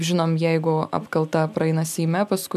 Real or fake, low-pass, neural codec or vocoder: real; 14.4 kHz; none